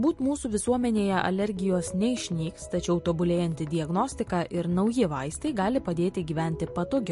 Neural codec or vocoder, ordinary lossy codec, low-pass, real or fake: none; MP3, 48 kbps; 14.4 kHz; real